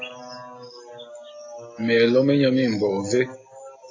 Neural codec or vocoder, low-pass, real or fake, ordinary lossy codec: none; 7.2 kHz; real; AAC, 32 kbps